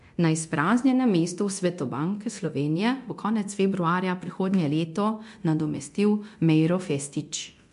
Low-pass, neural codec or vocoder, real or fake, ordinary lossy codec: 10.8 kHz; codec, 24 kHz, 0.9 kbps, DualCodec; fake; MP3, 64 kbps